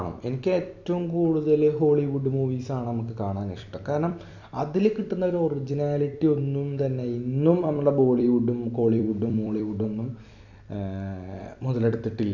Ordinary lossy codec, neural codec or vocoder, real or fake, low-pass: none; vocoder, 44.1 kHz, 128 mel bands every 256 samples, BigVGAN v2; fake; 7.2 kHz